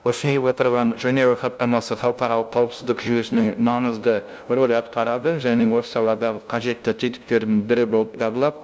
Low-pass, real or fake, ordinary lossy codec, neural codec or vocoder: none; fake; none; codec, 16 kHz, 0.5 kbps, FunCodec, trained on LibriTTS, 25 frames a second